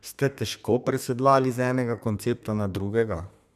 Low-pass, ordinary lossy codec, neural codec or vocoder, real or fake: 14.4 kHz; none; codec, 32 kHz, 1.9 kbps, SNAC; fake